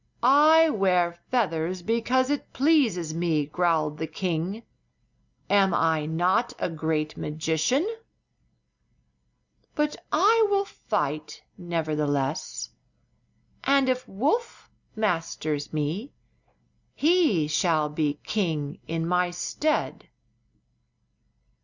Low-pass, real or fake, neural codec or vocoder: 7.2 kHz; real; none